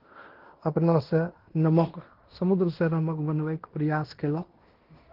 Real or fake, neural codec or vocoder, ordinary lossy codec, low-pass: fake; codec, 16 kHz in and 24 kHz out, 0.9 kbps, LongCat-Audio-Codec, fine tuned four codebook decoder; Opus, 16 kbps; 5.4 kHz